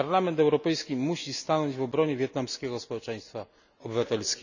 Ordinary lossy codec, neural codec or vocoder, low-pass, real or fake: none; none; 7.2 kHz; real